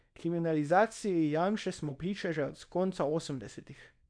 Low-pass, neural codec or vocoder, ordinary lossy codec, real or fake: 9.9 kHz; codec, 24 kHz, 0.9 kbps, WavTokenizer, small release; MP3, 64 kbps; fake